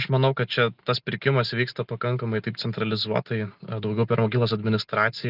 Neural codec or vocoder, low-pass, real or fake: none; 5.4 kHz; real